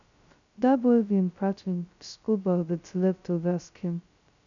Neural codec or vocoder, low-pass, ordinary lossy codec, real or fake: codec, 16 kHz, 0.2 kbps, FocalCodec; 7.2 kHz; none; fake